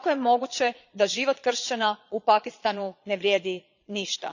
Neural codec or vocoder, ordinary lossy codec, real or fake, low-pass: vocoder, 22.05 kHz, 80 mel bands, Vocos; none; fake; 7.2 kHz